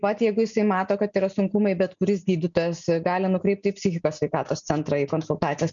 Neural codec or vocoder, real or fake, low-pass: none; real; 7.2 kHz